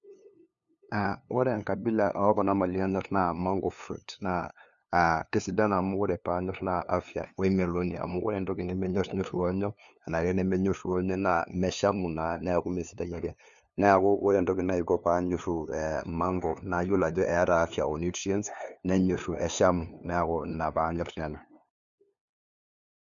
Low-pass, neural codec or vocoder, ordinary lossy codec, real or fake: 7.2 kHz; codec, 16 kHz, 2 kbps, FunCodec, trained on LibriTTS, 25 frames a second; MP3, 96 kbps; fake